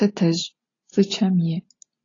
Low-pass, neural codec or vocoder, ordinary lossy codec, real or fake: 7.2 kHz; none; AAC, 32 kbps; real